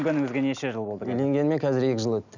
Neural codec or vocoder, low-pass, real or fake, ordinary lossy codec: none; 7.2 kHz; real; none